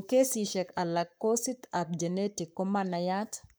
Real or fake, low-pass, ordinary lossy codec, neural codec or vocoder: fake; none; none; codec, 44.1 kHz, 7.8 kbps, Pupu-Codec